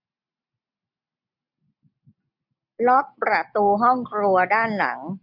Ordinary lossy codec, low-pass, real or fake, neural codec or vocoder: none; 5.4 kHz; real; none